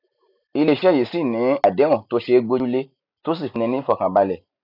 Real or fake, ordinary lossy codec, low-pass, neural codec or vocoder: real; none; 5.4 kHz; none